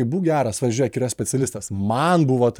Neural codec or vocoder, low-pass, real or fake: vocoder, 44.1 kHz, 128 mel bands every 512 samples, BigVGAN v2; 19.8 kHz; fake